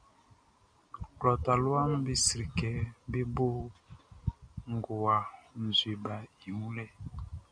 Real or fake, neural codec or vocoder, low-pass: real; none; 9.9 kHz